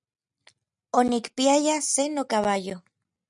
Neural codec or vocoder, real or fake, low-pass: none; real; 10.8 kHz